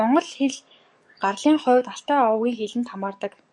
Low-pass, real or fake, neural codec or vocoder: 10.8 kHz; fake; codec, 44.1 kHz, 7.8 kbps, DAC